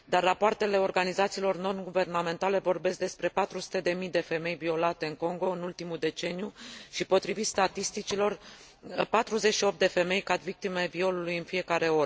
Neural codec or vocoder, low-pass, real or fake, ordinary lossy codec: none; none; real; none